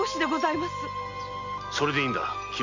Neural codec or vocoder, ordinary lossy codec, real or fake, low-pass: none; MP3, 48 kbps; real; 7.2 kHz